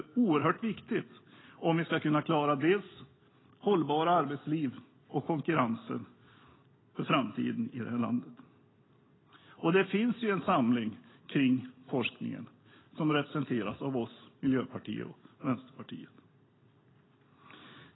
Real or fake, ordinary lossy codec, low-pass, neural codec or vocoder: real; AAC, 16 kbps; 7.2 kHz; none